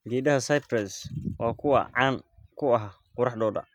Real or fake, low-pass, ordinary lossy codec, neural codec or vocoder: real; 19.8 kHz; none; none